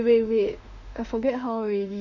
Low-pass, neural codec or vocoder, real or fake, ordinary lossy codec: 7.2 kHz; autoencoder, 48 kHz, 32 numbers a frame, DAC-VAE, trained on Japanese speech; fake; none